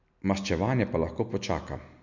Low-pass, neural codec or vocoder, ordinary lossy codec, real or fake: 7.2 kHz; none; none; real